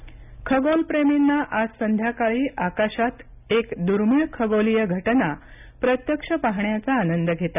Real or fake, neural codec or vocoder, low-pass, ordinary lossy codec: real; none; 3.6 kHz; none